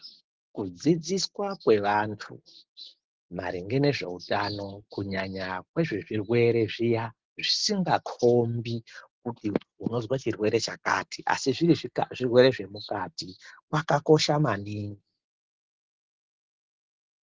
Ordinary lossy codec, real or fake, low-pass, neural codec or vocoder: Opus, 16 kbps; real; 7.2 kHz; none